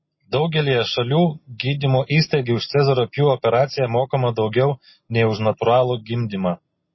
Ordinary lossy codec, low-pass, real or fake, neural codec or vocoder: MP3, 24 kbps; 7.2 kHz; real; none